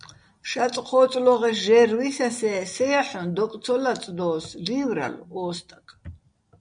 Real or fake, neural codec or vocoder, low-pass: real; none; 9.9 kHz